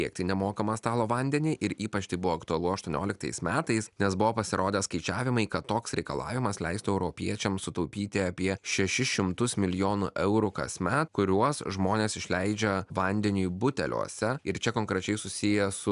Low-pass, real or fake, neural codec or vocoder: 10.8 kHz; real; none